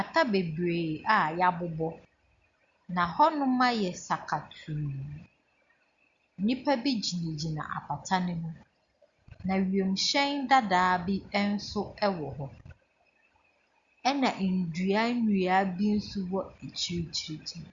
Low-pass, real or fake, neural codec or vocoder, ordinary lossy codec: 7.2 kHz; real; none; Opus, 64 kbps